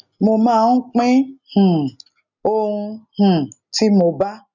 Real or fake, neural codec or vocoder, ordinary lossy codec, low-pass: real; none; none; 7.2 kHz